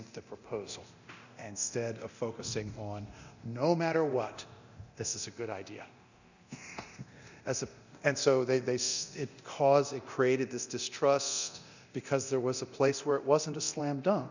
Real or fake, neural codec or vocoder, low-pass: fake; codec, 24 kHz, 0.9 kbps, DualCodec; 7.2 kHz